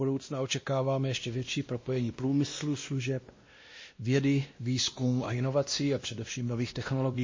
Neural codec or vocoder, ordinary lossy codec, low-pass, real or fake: codec, 16 kHz, 1 kbps, X-Codec, WavLM features, trained on Multilingual LibriSpeech; MP3, 32 kbps; 7.2 kHz; fake